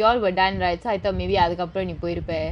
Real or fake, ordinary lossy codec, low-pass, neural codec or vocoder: fake; MP3, 96 kbps; 10.8 kHz; vocoder, 24 kHz, 100 mel bands, Vocos